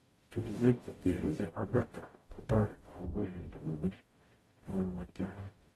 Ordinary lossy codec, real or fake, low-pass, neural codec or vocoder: AAC, 32 kbps; fake; 19.8 kHz; codec, 44.1 kHz, 0.9 kbps, DAC